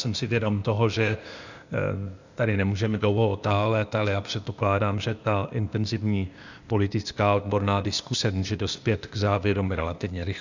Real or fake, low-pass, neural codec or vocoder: fake; 7.2 kHz; codec, 16 kHz, 0.8 kbps, ZipCodec